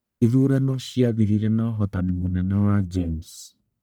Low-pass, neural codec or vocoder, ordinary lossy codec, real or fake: none; codec, 44.1 kHz, 1.7 kbps, Pupu-Codec; none; fake